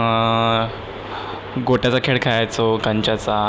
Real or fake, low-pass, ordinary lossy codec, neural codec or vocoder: real; none; none; none